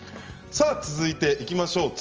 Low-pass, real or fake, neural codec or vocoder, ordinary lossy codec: 7.2 kHz; real; none; Opus, 24 kbps